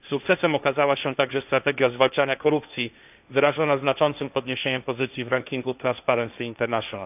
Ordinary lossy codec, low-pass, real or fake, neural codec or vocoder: none; 3.6 kHz; fake; codec, 16 kHz, 1.1 kbps, Voila-Tokenizer